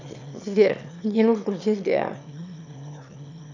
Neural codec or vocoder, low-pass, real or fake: autoencoder, 22.05 kHz, a latent of 192 numbers a frame, VITS, trained on one speaker; 7.2 kHz; fake